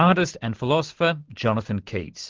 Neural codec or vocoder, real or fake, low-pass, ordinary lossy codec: none; real; 7.2 kHz; Opus, 16 kbps